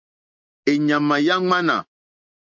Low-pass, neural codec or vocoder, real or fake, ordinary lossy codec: 7.2 kHz; none; real; MP3, 48 kbps